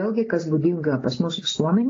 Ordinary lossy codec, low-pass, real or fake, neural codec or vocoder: AAC, 32 kbps; 7.2 kHz; real; none